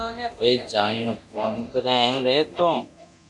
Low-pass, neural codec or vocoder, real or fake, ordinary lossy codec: 10.8 kHz; codec, 24 kHz, 0.9 kbps, DualCodec; fake; MP3, 96 kbps